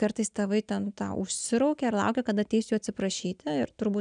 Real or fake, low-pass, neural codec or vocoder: real; 9.9 kHz; none